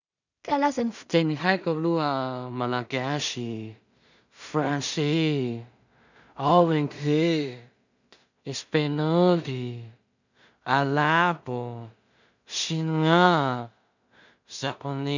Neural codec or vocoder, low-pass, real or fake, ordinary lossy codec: codec, 16 kHz in and 24 kHz out, 0.4 kbps, LongCat-Audio-Codec, two codebook decoder; 7.2 kHz; fake; none